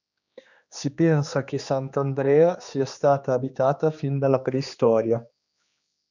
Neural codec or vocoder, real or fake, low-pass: codec, 16 kHz, 2 kbps, X-Codec, HuBERT features, trained on general audio; fake; 7.2 kHz